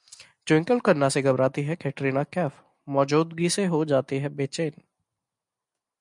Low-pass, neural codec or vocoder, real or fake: 10.8 kHz; none; real